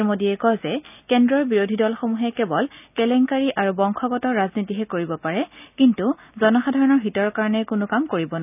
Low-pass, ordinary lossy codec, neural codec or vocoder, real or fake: 3.6 kHz; none; none; real